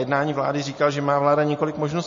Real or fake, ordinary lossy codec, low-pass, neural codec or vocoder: real; MP3, 32 kbps; 7.2 kHz; none